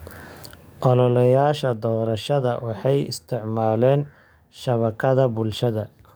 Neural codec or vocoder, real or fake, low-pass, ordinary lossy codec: codec, 44.1 kHz, 7.8 kbps, DAC; fake; none; none